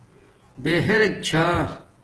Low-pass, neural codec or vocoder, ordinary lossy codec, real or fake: 10.8 kHz; vocoder, 48 kHz, 128 mel bands, Vocos; Opus, 16 kbps; fake